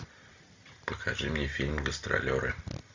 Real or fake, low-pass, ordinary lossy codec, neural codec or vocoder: real; 7.2 kHz; MP3, 64 kbps; none